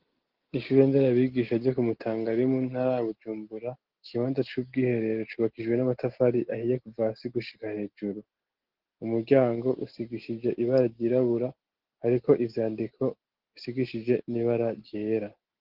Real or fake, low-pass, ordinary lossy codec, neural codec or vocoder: real; 5.4 kHz; Opus, 16 kbps; none